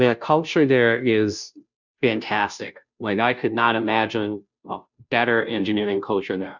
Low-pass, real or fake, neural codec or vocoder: 7.2 kHz; fake; codec, 16 kHz, 0.5 kbps, FunCodec, trained on Chinese and English, 25 frames a second